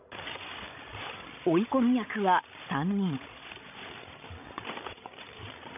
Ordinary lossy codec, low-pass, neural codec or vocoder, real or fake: none; 3.6 kHz; codec, 16 kHz, 16 kbps, FreqCodec, larger model; fake